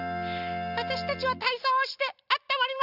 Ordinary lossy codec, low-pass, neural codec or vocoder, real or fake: none; 5.4 kHz; none; real